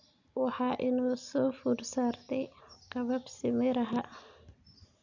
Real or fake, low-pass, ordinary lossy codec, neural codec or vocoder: real; 7.2 kHz; none; none